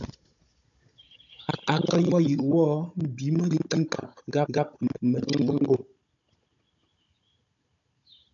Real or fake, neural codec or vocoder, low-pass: fake; codec, 16 kHz, 16 kbps, FunCodec, trained on Chinese and English, 50 frames a second; 7.2 kHz